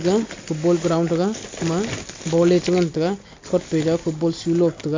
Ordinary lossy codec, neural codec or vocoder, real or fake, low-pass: MP3, 64 kbps; none; real; 7.2 kHz